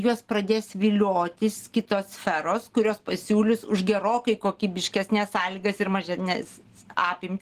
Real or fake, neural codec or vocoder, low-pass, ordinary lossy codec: real; none; 14.4 kHz; Opus, 16 kbps